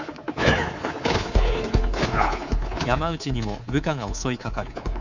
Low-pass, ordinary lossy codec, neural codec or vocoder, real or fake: 7.2 kHz; none; codec, 24 kHz, 3.1 kbps, DualCodec; fake